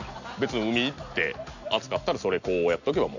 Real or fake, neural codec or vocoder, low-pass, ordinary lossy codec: real; none; 7.2 kHz; none